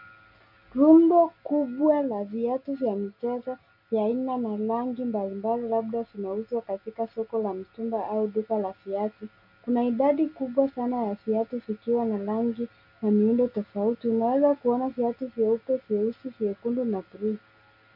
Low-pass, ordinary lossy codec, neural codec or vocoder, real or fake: 5.4 kHz; MP3, 48 kbps; none; real